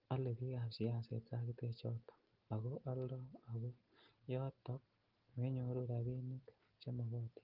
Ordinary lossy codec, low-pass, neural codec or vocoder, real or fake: Opus, 16 kbps; 5.4 kHz; none; real